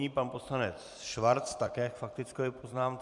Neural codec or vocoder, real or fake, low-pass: vocoder, 44.1 kHz, 128 mel bands every 256 samples, BigVGAN v2; fake; 10.8 kHz